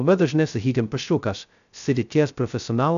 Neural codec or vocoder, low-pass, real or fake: codec, 16 kHz, 0.2 kbps, FocalCodec; 7.2 kHz; fake